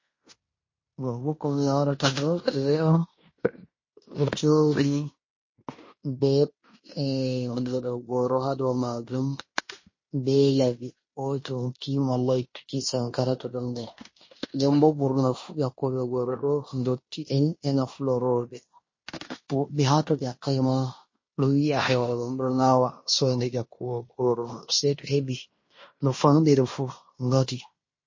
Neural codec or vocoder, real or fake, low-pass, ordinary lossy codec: codec, 16 kHz in and 24 kHz out, 0.9 kbps, LongCat-Audio-Codec, fine tuned four codebook decoder; fake; 7.2 kHz; MP3, 32 kbps